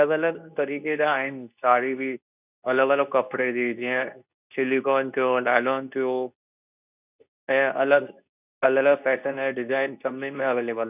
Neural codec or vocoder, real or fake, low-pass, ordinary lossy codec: codec, 24 kHz, 0.9 kbps, WavTokenizer, medium speech release version 2; fake; 3.6 kHz; none